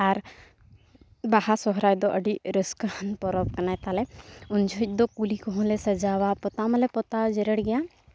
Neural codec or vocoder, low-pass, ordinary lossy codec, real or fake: none; none; none; real